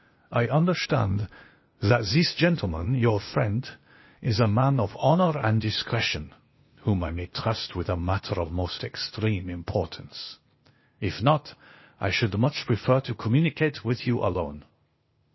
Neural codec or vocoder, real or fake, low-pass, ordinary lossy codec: codec, 16 kHz, 0.8 kbps, ZipCodec; fake; 7.2 kHz; MP3, 24 kbps